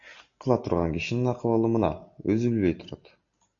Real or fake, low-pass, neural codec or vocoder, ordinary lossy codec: real; 7.2 kHz; none; MP3, 64 kbps